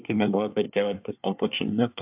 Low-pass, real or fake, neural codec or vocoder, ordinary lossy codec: 3.6 kHz; fake; codec, 24 kHz, 1 kbps, SNAC; AAC, 32 kbps